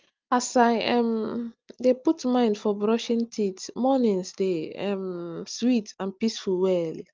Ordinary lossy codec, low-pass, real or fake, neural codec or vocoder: Opus, 24 kbps; 7.2 kHz; real; none